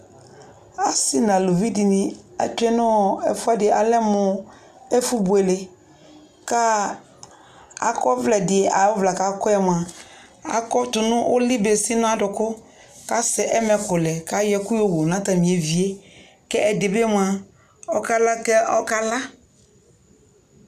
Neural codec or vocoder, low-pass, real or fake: none; 14.4 kHz; real